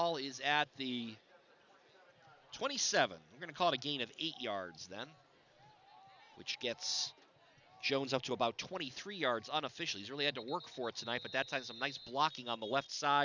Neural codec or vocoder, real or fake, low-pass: none; real; 7.2 kHz